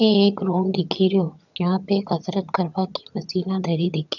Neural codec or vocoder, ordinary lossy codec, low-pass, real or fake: vocoder, 22.05 kHz, 80 mel bands, HiFi-GAN; none; 7.2 kHz; fake